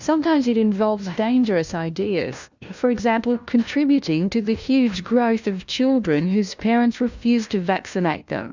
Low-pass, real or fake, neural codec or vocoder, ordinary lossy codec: 7.2 kHz; fake; codec, 16 kHz, 1 kbps, FunCodec, trained on LibriTTS, 50 frames a second; Opus, 64 kbps